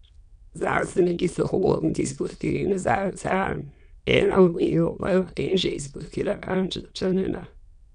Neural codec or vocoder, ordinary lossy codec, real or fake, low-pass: autoencoder, 22.05 kHz, a latent of 192 numbers a frame, VITS, trained on many speakers; none; fake; 9.9 kHz